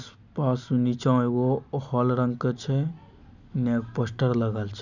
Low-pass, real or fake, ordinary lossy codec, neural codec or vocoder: 7.2 kHz; real; none; none